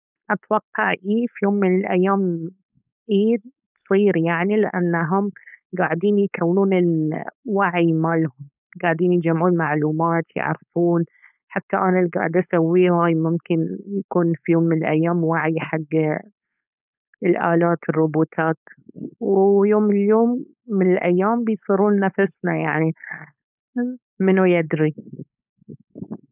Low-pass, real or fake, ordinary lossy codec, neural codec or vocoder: 3.6 kHz; fake; none; codec, 16 kHz, 4.8 kbps, FACodec